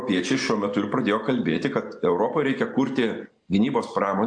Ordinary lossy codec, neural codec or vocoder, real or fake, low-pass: AAC, 64 kbps; none; real; 9.9 kHz